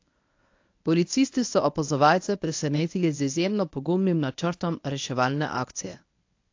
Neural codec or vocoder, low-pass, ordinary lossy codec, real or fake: codec, 24 kHz, 0.9 kbps, WavTokenizer, medium speech release version 1; 7.2 kHz; AAC, 48 kbps; fake